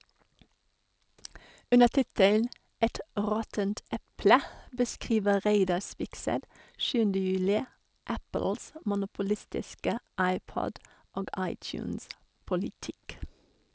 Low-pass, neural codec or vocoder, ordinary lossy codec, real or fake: none; none; none; real